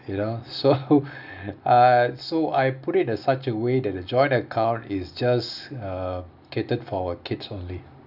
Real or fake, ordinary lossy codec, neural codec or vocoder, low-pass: real; none; none; 5.4 kHz